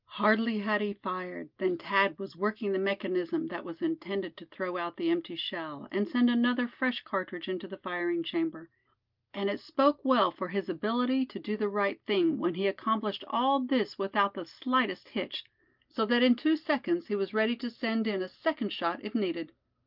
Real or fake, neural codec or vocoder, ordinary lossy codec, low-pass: real; none; Opus, 32 kbps; 5.4 kHz